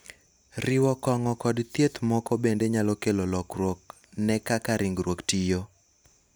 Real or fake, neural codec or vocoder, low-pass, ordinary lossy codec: real; none; none; none